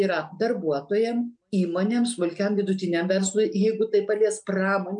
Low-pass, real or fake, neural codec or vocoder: 9.9 kHz; real; none